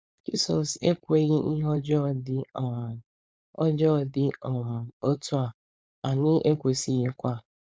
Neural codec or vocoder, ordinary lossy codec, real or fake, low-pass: codec, 16 kHz, 4.8 kbps, FACodec; none; fake; none